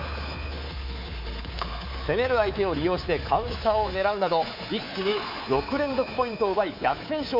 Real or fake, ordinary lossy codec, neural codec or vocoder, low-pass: fake; MP3, 48 kbps; codec, 24 kHz, 3.1 kbps, DualCodec; 5.4 kHz